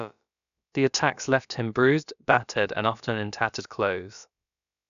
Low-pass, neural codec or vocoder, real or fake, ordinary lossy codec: 7.2 kHz; codec, 16 kHz, about 1 kbps, DyCAST, with the encoder's durations; fake; MP3, 96 kbps